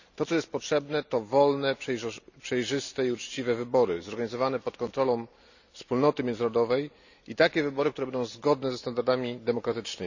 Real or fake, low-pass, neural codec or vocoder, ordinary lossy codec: real; 7.2 kHz; none; none